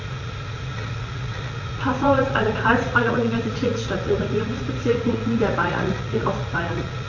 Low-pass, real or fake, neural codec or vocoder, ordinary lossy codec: 7.2 kHz; fake; vocoder, 44.1 kHz, 80 mel bands, Vocos; AAC, 48 kbps